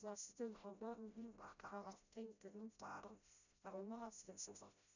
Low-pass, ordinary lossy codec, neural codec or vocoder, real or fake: 7.2 kHz; none; codec, 16 kHz, 0.5 kbps, FreqCodec, smaller model; fake